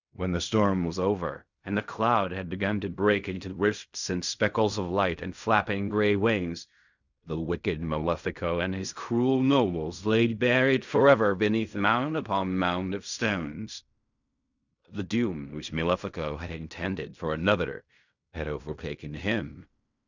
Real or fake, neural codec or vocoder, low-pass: fake; codec, 16 kHz in and 24 kHz out, 0.4 kbps, LongCat-Audio-Codec, fine tuned four codebook decoder; 7.2 kHz